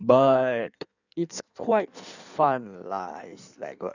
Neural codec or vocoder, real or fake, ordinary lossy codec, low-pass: codec, 16 kHz in and 24 kHz out, 1.1 kbps, FireRedTTS-2 codec; fake; none; 7.2 kHz